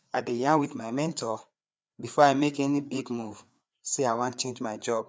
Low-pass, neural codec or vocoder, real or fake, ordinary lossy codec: none; codec, 16 kHz, 4 kbps, FreqCodec, larger model; fake; none